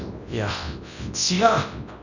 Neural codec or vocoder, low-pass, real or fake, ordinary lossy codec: codec, 24 kHz, 0.9 kbps, WavTokenizer, large speech release; 7.2 kHz; fake; none